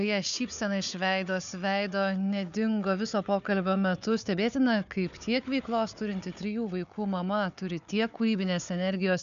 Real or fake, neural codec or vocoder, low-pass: fake; codec, 16 kHz, 4 kbps, FunCodec, trained on Chinese and English, 50 frames a second; 7.2 kHz